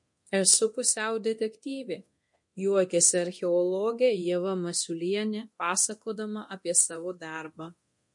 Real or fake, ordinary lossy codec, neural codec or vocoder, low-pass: fake; MP3, 48 kbps; codec, 24 kHz, 0.9 kbps, DualCodec; 10.8 kHz